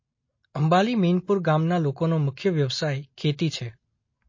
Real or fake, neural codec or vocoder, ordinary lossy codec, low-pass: real; none; MP3, 32 kbps; 7.2 kHz